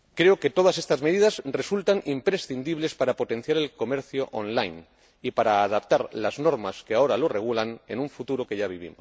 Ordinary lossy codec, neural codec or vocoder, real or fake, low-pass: none; none; real; none